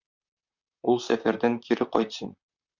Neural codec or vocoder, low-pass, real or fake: vocoder, 44.1 kHz, 128 mel bands, Pupu-Vocoder; 7.2 kHz; fake